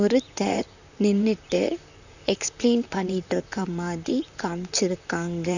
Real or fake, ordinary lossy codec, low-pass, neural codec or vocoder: fake; none; 7.2 kHz; vocoder, 44.1 kHz, 128 mel bands, Pupu-Vocoder